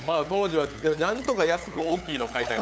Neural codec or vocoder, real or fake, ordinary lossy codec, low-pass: codec, 16 kHz, 8 kbps, FunCodec, trained on LibriTTS, 25 frames a second; fake; none; none